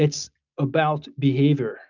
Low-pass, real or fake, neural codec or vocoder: 7.2 kHz; real; none